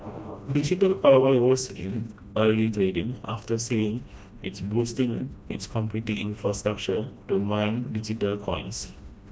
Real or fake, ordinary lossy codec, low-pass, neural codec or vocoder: fake; none; none; codec, 16 kHz, 1 kbps, FreqCodec, smaller model